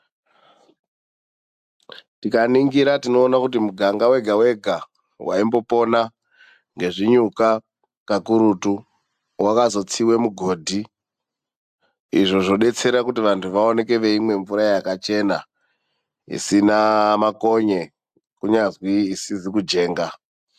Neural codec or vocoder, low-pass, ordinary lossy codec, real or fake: none; 14.4 kHz; AAC, 96 kbps; real